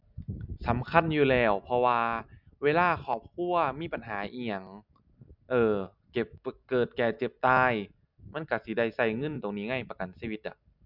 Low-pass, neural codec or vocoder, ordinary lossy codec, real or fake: 5.4 kHz; none; none; real